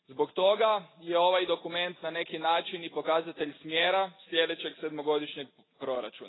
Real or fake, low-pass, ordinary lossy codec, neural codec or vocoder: real; 7.2 kHz; AAC, 16 kbps; none